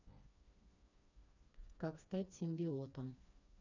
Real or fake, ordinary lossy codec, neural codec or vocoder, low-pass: fake; none; codec, 16 kHz, 2 kbps, FreqCodec, smaller model; 7.2 kHz